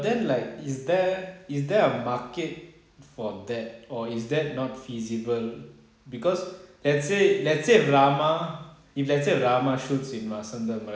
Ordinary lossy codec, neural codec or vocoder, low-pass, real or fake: none; none; none; real